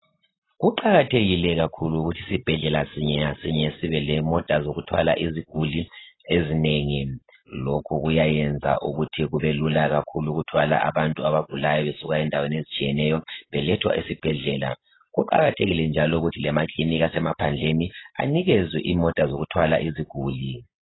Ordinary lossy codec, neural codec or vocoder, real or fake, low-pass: AAC, 16 kbps; none; real; 7.2 kHz